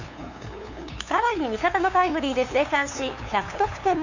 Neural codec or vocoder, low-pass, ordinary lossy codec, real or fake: codec, 16 kHz, 2 kbps, FunCodec, trained on LibriTTS, 25 frames a second; 7.2 kHz; none; fake